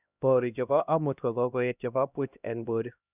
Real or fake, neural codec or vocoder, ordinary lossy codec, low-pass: fake; codec, 16 kHz, 1 kbps, X-Codec, HuBERT features, trained on LibriSpeech; none; 3.6 kHz